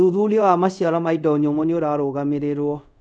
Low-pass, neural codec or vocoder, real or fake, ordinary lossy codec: 9.9 kHz; codec, 24 kHz, 0.5 kbps, DualCodec; fake; none